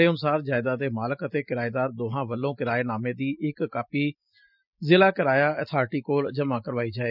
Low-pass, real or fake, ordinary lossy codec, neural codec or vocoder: 5.4 kHz; real; none; none